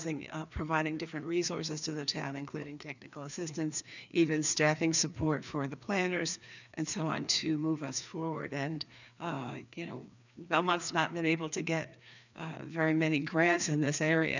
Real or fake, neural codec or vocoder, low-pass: fake; codec, 16 kHz, 2 kbps, FreqCodec, larger model; 7.2 kHz